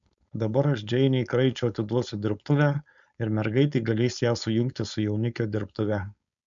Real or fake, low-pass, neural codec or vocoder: fake; 7.2 kHz; codec, 16 kHz, 4.8 kbps, FACodec